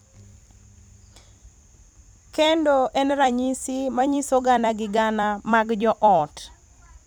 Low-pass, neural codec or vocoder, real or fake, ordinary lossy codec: 19.8 kHz; vocoder, 44.1 kHz, 128 mel bands every 256 samples, BigVGAN v2; fake; none